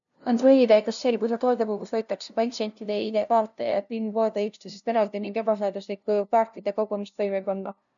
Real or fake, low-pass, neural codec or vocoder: fake; 7.2 kHz; codec, 16 kHz, 0.5 kbps, FunCodec, trained on LibriTTS, 25 frames a second